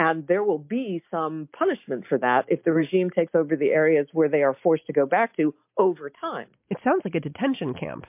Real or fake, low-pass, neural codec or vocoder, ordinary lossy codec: real; 3.6 kHz; none; MP3, 32 kbps